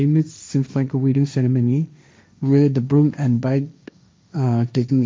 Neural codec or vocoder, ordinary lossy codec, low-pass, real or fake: codec, 16 kHz, 1.1 kbps, Voila-Tokenizer; none; none; fake